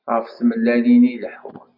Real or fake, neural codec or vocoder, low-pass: real; none; 5.4 kHz